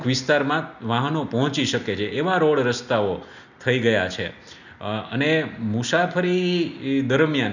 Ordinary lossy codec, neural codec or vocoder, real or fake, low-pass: none; none; real; 7.2 kHz